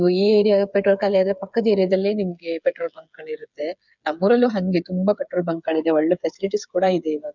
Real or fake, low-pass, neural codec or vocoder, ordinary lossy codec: fake; 7.2 kHz; codec, 16 kHz, 8 kbps, FreqCodec, smaller model; none